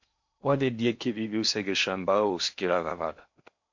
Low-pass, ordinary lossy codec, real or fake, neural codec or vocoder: 7.2 kHz; MP3, 48 kbps; fake; codec, 16 kHz in and 24 kHz out, 0.8 kbps, FocalCodec, streaming, 65536 codes